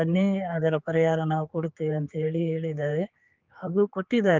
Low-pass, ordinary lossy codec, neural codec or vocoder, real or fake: 7.2 kHz; Opus, 24 kbps; codec, 16 kHz, 4 kbps, X-Codec, HuBERT features, trained on general audio; fake